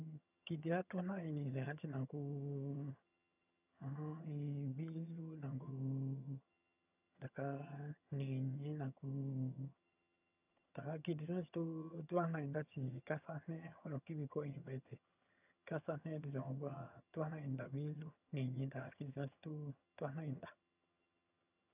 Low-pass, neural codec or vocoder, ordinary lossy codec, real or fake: 3.6 kHz; vocoder, 22.05 kHz, 80 mel bands, HiFi-GAN; none; fake